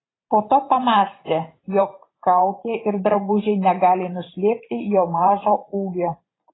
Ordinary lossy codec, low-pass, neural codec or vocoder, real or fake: AAC, 16 kbps; 7.2 kHz; vocoder, 44.1 kHz, 128 mel bands every 512 samples, BigVGAN v2; fake